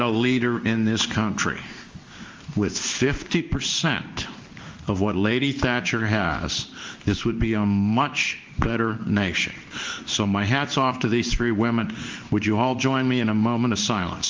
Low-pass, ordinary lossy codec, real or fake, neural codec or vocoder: 7.2 kHz; Opus, 32 kbps; fake; codec, 16 kHz in and 24 kHz out, 1 kbps, XY-Tokenizer